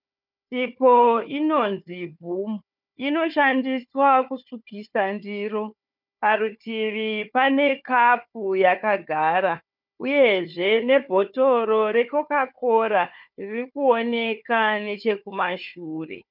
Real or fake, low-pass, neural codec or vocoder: fake; 5.4 kHz; codec, 16 kHz, 4 kbps, FunCodec, trained on Chinese and English, 50 frames a second